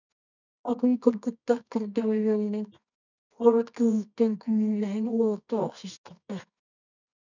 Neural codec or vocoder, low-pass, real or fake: codec, 24 kHz, 0.9 kbps, WavTokenizer, medium music audio release; 7.2 kHz; fake